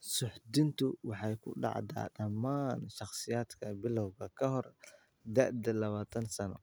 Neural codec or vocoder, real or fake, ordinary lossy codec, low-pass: none; real; none; none